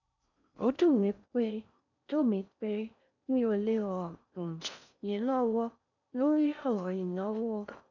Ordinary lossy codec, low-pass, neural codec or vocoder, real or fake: none; 7.2 kHz; codec, 16 kHz in and 24 kHz out, 0.6 kbps, FocalCodec, streaming, 4096 codes; fake